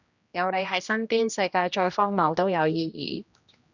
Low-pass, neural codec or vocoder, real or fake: 7.2 kHz; codec, 16 kHz, 1 kbps, X-Codec, HuBERT features, trained on general audio; fake